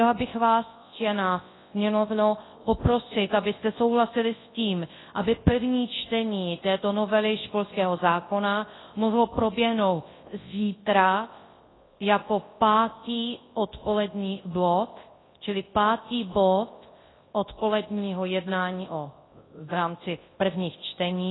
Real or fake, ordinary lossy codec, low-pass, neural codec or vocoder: fake; AAC, 16 kbps; 7.2 kHz; codec, 24 kHz, 0.9 kbps, WavTokenizer, large speech release